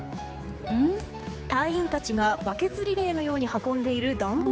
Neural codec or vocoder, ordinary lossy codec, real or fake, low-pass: codec, 16 kHz, 4 kbps, X-Codec, HuBERT features, trained on general audio; none; fake; none